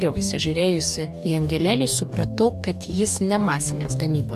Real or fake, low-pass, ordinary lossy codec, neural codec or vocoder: fake; 14.4 kHz; AAC, 96 kbps; codec, 44.1 kHz, 2.6 kbps, DAC